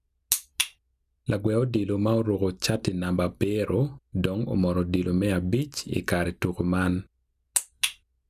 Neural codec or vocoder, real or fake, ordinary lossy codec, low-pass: none; real; none; 14.4 kHz